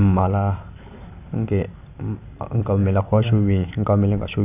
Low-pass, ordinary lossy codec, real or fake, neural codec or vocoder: 3.6 kHz; none; real; none